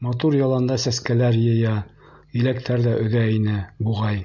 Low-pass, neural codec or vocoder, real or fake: 7.2 kHz; none; real